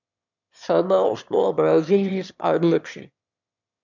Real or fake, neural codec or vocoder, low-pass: fake; autoencoder, 22.05 kHz, a latent of 192 numbers a frame, VITS, trained on one speaker; 7.2 kHz